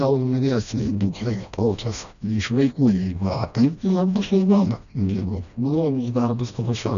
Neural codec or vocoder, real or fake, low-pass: codec, 16 kHz, 1 kbps, FreqCodec, smaller model; fake; 7.2 kHz